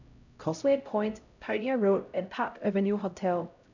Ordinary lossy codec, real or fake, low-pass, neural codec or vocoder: none; fake; 7.2 kHz; codec, 16 kHz, 0.5 kbps, X-Codec, HuBERT features, trained on LibriSpeech